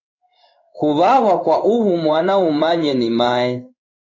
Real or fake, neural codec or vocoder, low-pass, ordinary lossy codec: fake; codec, 16 kHz in and 24 kHz out, 1 kbps, XY-Tokenizer; 7.2 kHz; AAC, 48 kbps